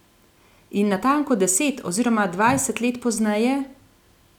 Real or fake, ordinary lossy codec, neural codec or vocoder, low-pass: real; none; none; 19.8 kHz